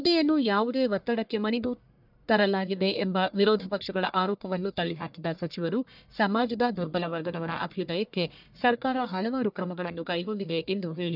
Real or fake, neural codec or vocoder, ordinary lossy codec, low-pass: fake; codec, 44.1 kHz, 1.7 kbps, Pupu-Codec; none; 5.4 kHz